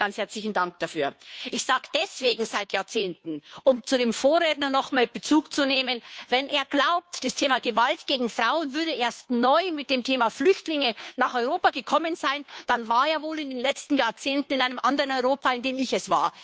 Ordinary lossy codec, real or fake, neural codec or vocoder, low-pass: none; fake; codec, 16 kHz, 2 kbps, FunCodec, trained on Chinese and English, 25 frames a second; none